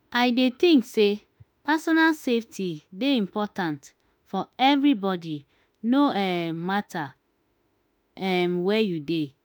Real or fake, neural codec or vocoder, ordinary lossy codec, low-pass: fake; autoencoder, 48 kHz, 32 numbers a frame, DAC-VAE, trained on Japanese speech; none; none